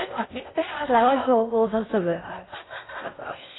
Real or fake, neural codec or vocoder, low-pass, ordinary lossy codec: fake; codec, 16 kHz in and 24 kHz out, 0.6 kbps, FocalCodec, streaming, 2048 codes; 7.2 kHz; AAC, 16 kbps